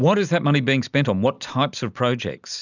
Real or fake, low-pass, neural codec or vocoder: real; 7.2 kHz; none